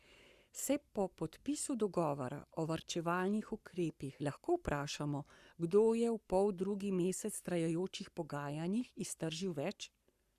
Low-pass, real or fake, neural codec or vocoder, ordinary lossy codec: 14.4 kHz; fake; codec, 44.1 kHz, 7.8 kbps, Pupu-Codec; Opus, 64 kbps